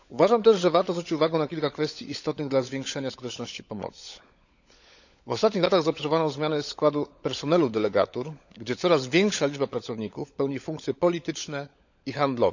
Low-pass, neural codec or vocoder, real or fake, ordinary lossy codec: 7.2 kHz; codec, 16 kHz, 16 kbps, FunCodec, trained on LibriTTS, 50 frames a second; fake; none